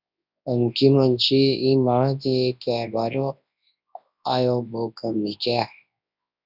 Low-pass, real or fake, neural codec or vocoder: 5.4 kHz; fake; codec, 24 kHz, 0.9 kbps, WavTokenizer, large speech release